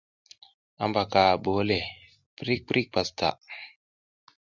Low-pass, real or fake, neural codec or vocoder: 7.2 kHz; real; none